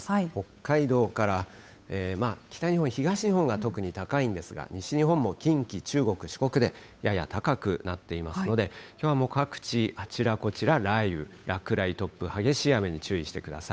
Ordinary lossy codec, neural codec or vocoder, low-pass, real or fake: none; codec, 16 kHz, 8 kbps, FunCodec, trained on Chinese and English, 25 frames a second; none; fake